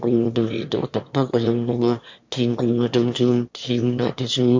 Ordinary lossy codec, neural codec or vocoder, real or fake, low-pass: MP3, 48 kbps; autoencoder, 22.05 kHz, a latent of 192 numbers a frame, VITS, trained on one speaker; fake; 7.2 kHz